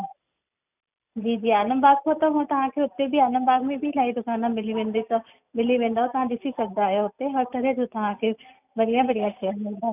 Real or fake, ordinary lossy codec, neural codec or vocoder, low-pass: real; none; none; 3.6 kHz